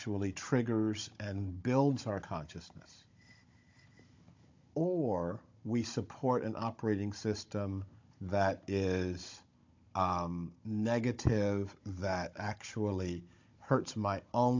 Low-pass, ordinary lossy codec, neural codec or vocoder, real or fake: 7.2 kHz; MP3, 48 kbps; codec, 16 kHz, 16 kbps, FunCodec, trained on Chinese and English, 50 frames a second; fake